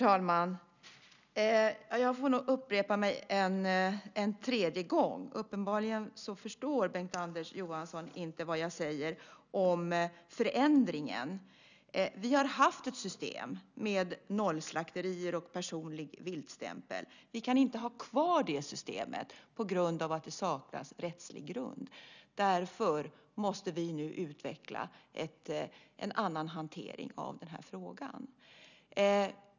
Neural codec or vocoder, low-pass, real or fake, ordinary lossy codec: none; 7.2 kHz; real; none